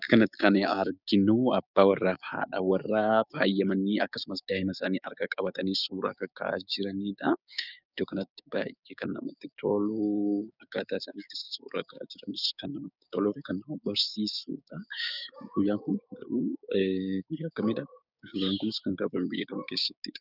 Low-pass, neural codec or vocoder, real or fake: 5.4 kHz; codec, 16 kHz, 6 kbps, DAC; fake